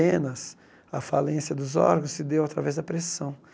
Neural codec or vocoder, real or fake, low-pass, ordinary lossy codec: none; real; none; none